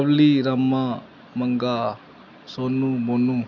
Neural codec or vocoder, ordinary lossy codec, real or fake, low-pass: none; none; real; 7.2 kHz